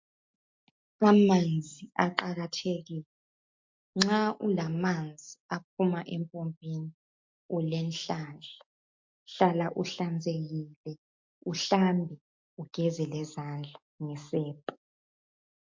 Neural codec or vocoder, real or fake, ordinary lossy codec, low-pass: none; real; MP3, 48 kbps; 7.2 kHz